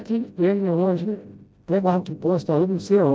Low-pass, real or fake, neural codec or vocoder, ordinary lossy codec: none; fake; codec, 16 kHz, 0.5 kbps, FreqCodec, smaller model; none